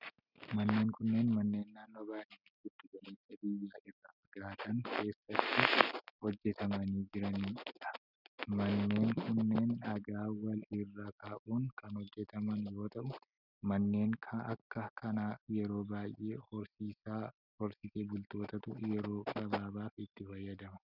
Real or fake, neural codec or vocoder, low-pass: real; none; 5.4 kHz